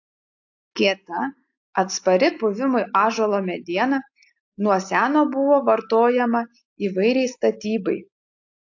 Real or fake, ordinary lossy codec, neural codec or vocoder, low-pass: real; AAC, 48 kbps; none; 7.2 kHz